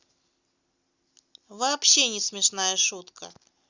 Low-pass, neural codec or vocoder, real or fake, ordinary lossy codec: 7.2 kHz; none; real; Opus, 64 kbps